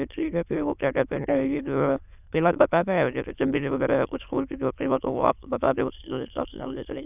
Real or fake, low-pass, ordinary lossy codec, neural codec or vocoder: fake; 3.6 kHz; none; autoencoder, 22.05 kHz, a latent of 192 numbers a frame, VITS, trained on many speakers